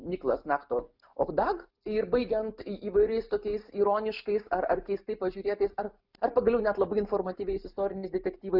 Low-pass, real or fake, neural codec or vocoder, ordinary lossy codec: 5.4 kHz; real; none; Opus, 64 kbps